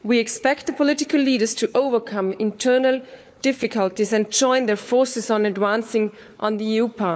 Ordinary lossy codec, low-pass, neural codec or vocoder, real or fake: none; none; codec, 16 kHz, 4 kbps, FunCodec, trained on Chinese and English, 50 frames a second; fake